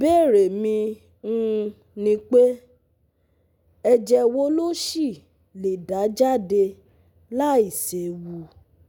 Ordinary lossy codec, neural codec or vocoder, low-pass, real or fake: none; none; none; real